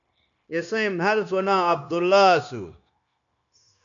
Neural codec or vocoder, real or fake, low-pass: codec, 16 kHz, 0.9 kbps, LongCat-Audio-Codec; fake; 7.2 kHz